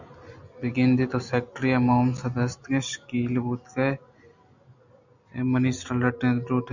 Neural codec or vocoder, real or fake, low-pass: none; real; 7.2 kHz